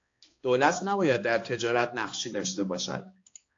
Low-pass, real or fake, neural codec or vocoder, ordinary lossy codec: 7.2 kHz; fake; codec, 16 kHz, 2 kbps, X-Codec, HuBERT features, trained on balanced general audio; AAC, 48 kbps